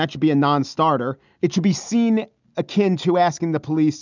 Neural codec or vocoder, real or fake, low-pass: none; real; 7.2 kHz